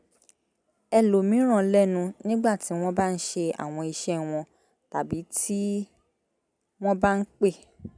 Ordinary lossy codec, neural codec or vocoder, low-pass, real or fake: none; none; 9.9 kHz; real